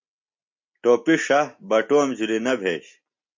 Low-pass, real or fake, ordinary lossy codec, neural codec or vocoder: 7.2 kHz; real; MP3, 48 kbps; none